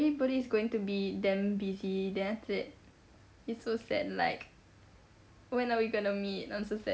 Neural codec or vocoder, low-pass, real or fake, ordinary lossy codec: none; none; real; none